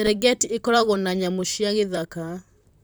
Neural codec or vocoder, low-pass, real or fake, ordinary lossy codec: vocoder, 44.1 kHz, 128 mel bands, Pupu-Vocoder; none; fake; none